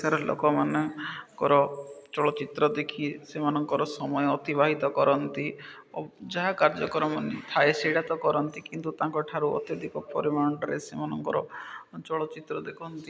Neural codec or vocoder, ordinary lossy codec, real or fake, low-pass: none; none; real; none